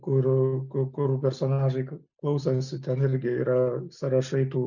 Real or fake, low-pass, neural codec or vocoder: real; 7.2 kHz; none